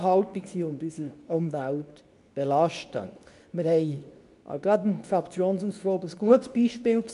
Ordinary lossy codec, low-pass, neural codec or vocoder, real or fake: none; 10.8 kHz; codec, 24 kHz, 0.9 kbps, WavTokenizer, medium speech release version 2; fake